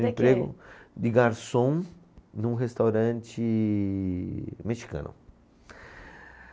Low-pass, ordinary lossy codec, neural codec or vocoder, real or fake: none; none; none; real